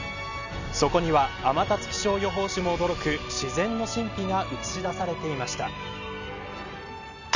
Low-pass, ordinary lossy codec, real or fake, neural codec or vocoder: 7.2 kHz; none; real; none